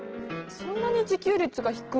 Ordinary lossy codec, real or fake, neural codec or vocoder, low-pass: Opus, 16 kbps; real; none; 7.2 kHz